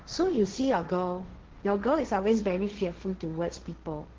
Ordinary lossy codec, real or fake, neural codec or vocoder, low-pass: Opus, 16 kbps; fake; codec, 16 kHz, 1.1 kbps, Voila-Tokenizer; 7.2 kHz